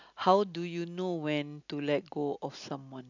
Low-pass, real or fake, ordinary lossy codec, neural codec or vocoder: 7.2 kHz; real; none; none